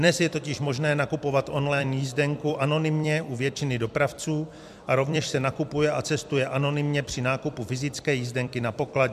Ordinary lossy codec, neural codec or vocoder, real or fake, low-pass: MP3, 96 kbps; vocoder, 44.1 kHz, 128 mel bands every 256 samples, BigVGAN v2; fake; 14.4 kHz